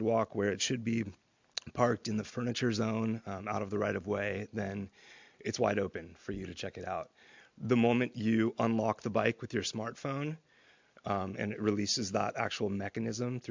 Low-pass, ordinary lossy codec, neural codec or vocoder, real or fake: 7.2 kHz; MP3, 64 kbps; none; real